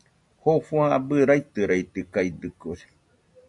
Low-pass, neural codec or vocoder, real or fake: 10.8 kHz; none; real